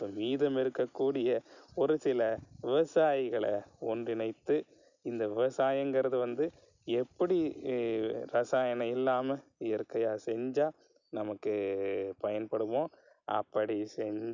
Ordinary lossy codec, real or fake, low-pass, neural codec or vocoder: none; real; 7.2 kHz; none